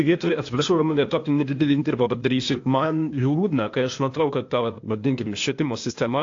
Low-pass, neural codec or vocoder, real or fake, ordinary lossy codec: 7.2 kHz; codec, 16 kHz, 0.8 kbps, ZipCodec; fake; AAC, 48 kbps